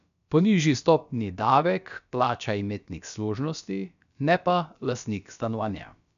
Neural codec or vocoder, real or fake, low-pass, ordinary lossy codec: codec, 16 kHz, about 1 kbps, DyCAST, with the encoder's durations; fake; 7.2 kHz; none